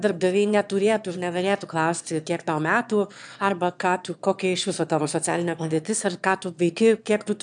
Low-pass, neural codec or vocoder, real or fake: 9.9 kHz; autoencoder, 22.05 kHz, a latent of 192 numbers a frame, VITS, trained on one speaker; fake